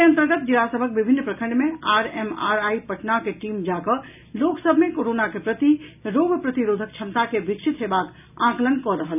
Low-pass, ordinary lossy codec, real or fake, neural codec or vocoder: 3.6 kHz; none; real; none